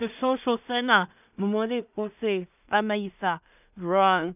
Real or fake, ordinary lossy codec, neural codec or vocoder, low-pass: fake; none; codec, 16 kHz in and 24 kHz out, 0.4 kbps, LongCat-Audio-Codec, two codebook decoder; 3.6 kHz